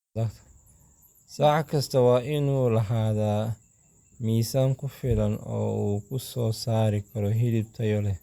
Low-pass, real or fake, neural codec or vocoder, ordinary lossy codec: 19.8 kHz; real; none; Opus, 64 kbps